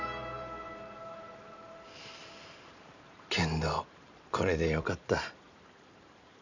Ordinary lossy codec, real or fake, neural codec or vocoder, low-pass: none; fake; vocoder, 44.1 kHz, 128 mel bands every 512 samples, BigVGAN v2; 7.2 kHz